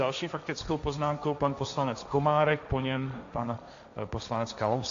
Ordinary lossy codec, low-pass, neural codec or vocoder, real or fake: AAC, 64 kbps; 7.2 kHz; codec, 16 kHz, 1.1 kbps, Voila-Tokenizer; fake